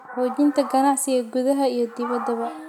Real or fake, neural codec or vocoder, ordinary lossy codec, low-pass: real; none; none; 19.8 kHz